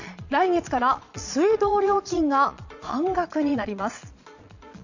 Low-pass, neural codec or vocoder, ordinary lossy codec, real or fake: 7.2 kHz; vocoder, 22.05 kHz, 80 mel bands, Vocos; none; fake